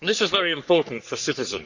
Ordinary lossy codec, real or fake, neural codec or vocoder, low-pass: none; fake; codec, 44.1 kHz, 3.4 kbps, Pupu-Codec; 7.2 kHz